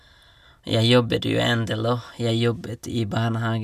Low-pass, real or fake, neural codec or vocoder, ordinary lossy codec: 14.4 kHz; real; none; none